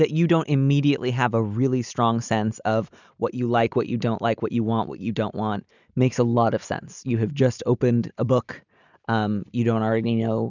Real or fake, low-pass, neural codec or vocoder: real; 7.2 kHz; none